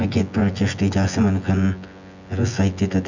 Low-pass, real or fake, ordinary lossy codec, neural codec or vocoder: 7.2 kHz; fake; none; vocoder, 24 kHz, 100 mel bands, Vocos